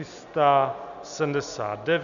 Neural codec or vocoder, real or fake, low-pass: none; real; 7.2 kHz